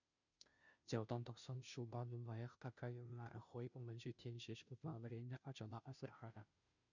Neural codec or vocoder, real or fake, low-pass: codec, 16 kHz, 0.5 kbps, FunCodec, trained on Chinese and English, 25 frames a second; fake; 7.2 kHz